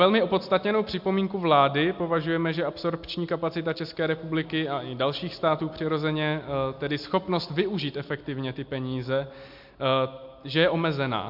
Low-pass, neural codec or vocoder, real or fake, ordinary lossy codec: 5.4 kHz; none; real; MP3, 48 kbps